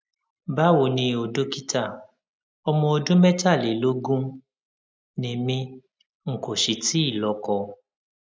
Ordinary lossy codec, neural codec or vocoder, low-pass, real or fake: none; none; none; real